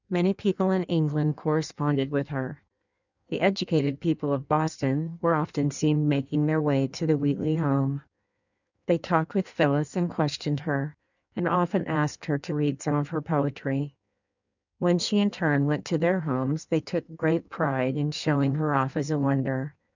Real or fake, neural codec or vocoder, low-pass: fake; codec, 16 kHz in and 24 kHz out, 1.1 kbps, FireRedTTS-2 codec; 7.2 kHz